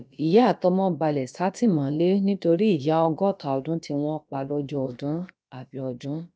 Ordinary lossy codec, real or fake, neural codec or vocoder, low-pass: none; fake; codec, 16 kHz, about 1 kbps, DyCAST, with the encoder's durations; none